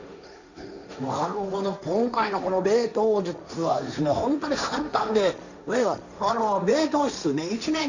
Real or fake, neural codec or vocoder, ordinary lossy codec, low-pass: fake; codec, 16 kHz, 1.1 kbps, Voila-Tokenizer; none; 7.2 kHz